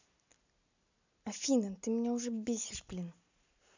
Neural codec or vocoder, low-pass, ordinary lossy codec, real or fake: none; 7.2 kHz; none; real